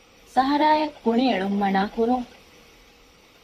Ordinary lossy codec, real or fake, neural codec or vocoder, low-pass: AAC, 64 kbps; fake; vocoder, 44.1 kHz, 128 mel bands, Pupu-Vocoder; 14.4 kHz